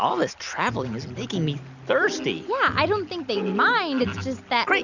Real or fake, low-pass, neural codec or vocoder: real; 7.2 kHz; none